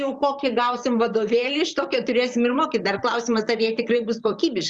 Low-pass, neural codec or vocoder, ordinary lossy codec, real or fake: 10.8 kHz; vocoder, 44.1 kHz, 128 mel bands, Pupu-Vocoder; Opus, 64 kbps; fake